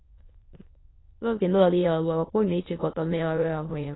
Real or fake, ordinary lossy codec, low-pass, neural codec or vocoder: fake; AAC, 16 kbps; 7.2 kHz; autoencoder, 22.05 kHz, a latent of 192 numbers a frame, VITS, trained on many speakers